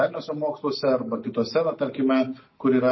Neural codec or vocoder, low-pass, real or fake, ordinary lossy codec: none; 7.2 kHz; real; MP3, 24 kbps